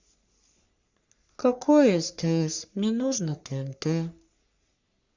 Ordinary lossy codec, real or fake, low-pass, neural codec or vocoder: Opus, 64 kbps; fake; 7.2 kHz; codec, 44.1 kHz, 3.4 kbps, Pupu-Codec